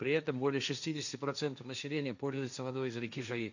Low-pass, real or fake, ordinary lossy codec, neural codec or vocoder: 7.2 kHz; fake; none; codec, 16 kHz, 1.1 kbps, Voila-Tokenizer